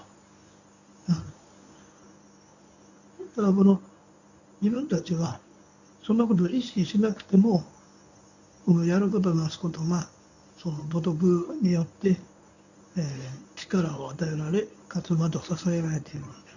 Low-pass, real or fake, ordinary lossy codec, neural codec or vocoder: 7.2 kHz; fake; none; codec, 24 kHz, 0.9 kbps, WavTokenizer, medium speech release version 1